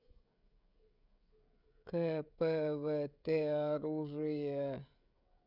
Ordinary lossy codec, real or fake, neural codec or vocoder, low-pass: none; fake; codec, 16 kHz, 16 kbps, FreqCodec, smaller model; 5.4 kHz